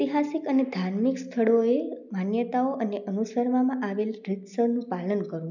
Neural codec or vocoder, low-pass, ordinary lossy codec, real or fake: none; 7.2 kHz; none; real